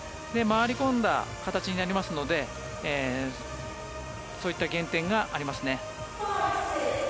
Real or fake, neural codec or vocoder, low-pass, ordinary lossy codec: real; none; none; none